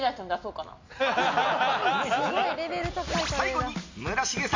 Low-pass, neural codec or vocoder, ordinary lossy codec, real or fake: 7.2 kHz; none; MP3, 64 kbps; real